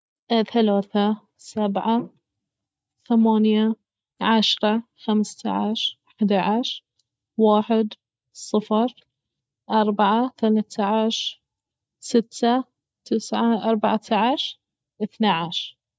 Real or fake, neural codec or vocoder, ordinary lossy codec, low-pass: real; none; none; none